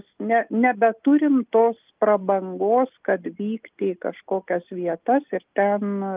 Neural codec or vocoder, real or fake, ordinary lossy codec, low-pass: none; real; Opus, 24 kbps; 3.6 kHz